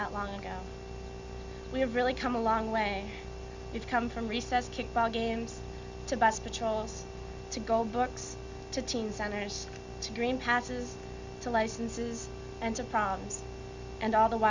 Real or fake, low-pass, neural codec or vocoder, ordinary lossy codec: real; 7.2 kHz; none; Opus, 64 kbps